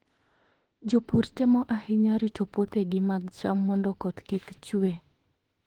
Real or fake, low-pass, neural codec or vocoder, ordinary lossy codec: fake; 19.8 kHz; autoencoder, 48 kHz, 32 numbers a frame, DAC-VAE, trained on Japanese speech; Opus, 16 kbps